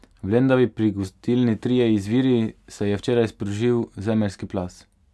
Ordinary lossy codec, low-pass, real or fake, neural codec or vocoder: none; none; real; none